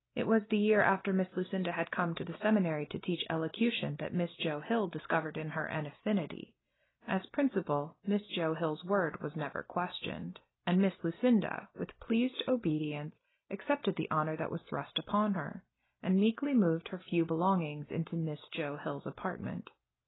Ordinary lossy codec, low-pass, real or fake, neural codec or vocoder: AAC, 16 kbps; 7.2 kHz; real; none